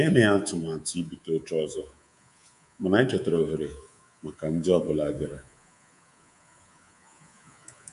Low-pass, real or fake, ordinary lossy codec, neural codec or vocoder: 10.8 kHz; fake; none; codec, 24 kHz, 3.1 kbps, DualCodec